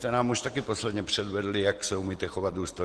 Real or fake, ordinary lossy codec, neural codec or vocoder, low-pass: real; Opus, 16 kbps; none; 10.8 kHz